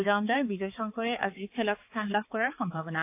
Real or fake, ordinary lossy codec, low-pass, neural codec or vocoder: fake; AAC, 24 kbps; 3.6 kHz; codec, 16 kHz, 4 kbps, X-Codec, HuBERT features, trained on balanced general audio